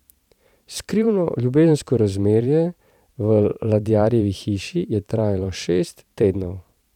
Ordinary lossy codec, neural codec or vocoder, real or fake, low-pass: none; vocoder, 44.1 kHz, 128 mel bands every 512 samples, BigVGAN v2; fake; 19.8 kHz